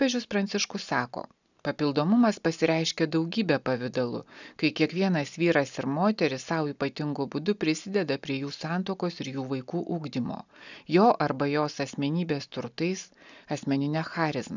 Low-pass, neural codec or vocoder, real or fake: 7.2 kHz; none; real